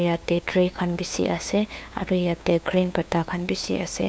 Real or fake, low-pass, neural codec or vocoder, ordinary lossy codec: fake; none; codec, 16 kHz, 2 kbps, FunCodec, trained on LibriTTS, 25 frames a second; none